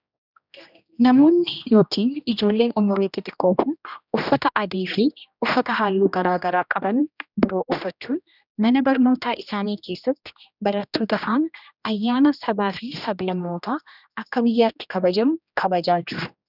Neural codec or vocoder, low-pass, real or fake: codec, 16 kHz, 1 kbps, X-Codec, HuBERT features, trained on general audio; 5.4 kHz; fake